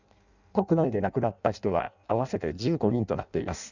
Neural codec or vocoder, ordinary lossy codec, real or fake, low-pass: codec, 16 kHz in and 24 kHz out, 0.6 kbps, FireRedTTS-2 codec; none; fake; 7.2 kHz